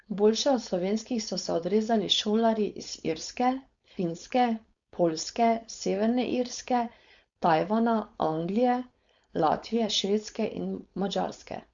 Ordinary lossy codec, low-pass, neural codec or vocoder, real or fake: Opus, 64 kbps; 7.2 kHz; codec, 16 kHz, 4.8 kbps, FACodec; fake